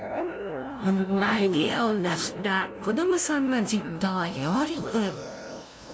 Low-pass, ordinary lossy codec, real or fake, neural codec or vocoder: none; none; fake; codec, 16 kHz, 0.5 kbps, FunCodec, trained on LibriTTS, 25 frames a second